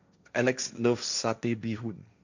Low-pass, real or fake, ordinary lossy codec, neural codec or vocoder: 7.2 kHz; fake; none; codec, 16 kHz, 1.1 kbps, Voila-Tokenizer